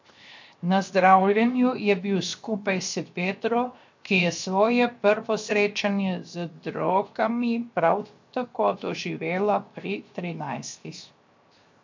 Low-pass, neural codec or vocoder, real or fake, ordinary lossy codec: 7.2 kHz; codec, 16 kHz, 0.7 kbps, FocalCodec; fake; MP3, 48 kbps